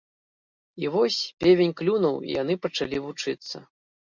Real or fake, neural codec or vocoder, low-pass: real; none; 7.2 kHz